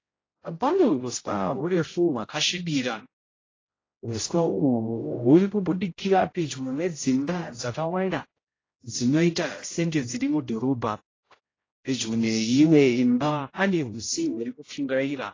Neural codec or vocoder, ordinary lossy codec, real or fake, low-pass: codec, 16 kHz, 0.5 kbps, X-Codec, HuBERT features, trained on general audio; AAC, 32 kbps; fake; 7.2 kHz